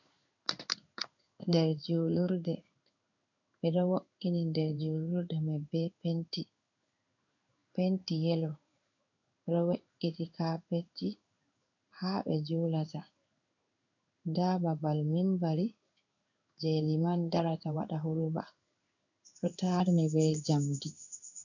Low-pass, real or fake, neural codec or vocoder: 7.2 kHz; fake; codec, 16 kHz in and 24 kHz out, 1 kbps, XY-Tokenizer